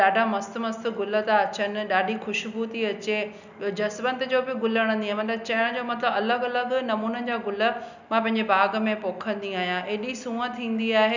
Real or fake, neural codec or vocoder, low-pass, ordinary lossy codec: real; none; 7.2 kHz; none